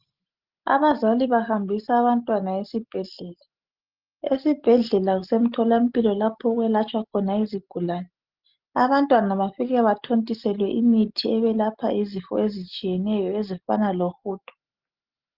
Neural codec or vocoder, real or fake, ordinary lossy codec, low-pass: none; real; Opus, 32 kbps; 5.4 kHz